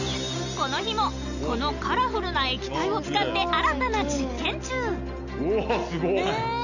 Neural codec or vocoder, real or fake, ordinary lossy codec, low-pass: none; real; none; 7.2 kHz